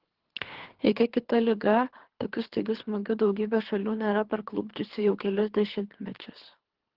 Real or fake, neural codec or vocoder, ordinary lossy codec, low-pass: fake; codec, 24 kHz, 3 kbps, HILCodec; Opus, 16 kbps; 5.4 kHz